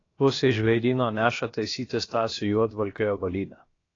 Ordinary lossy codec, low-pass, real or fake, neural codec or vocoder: AAC, 32 kbps; 7.2 kHz; fake; codec, 16 kHz, about 1 kbps, DyCAST, with the encoder's durations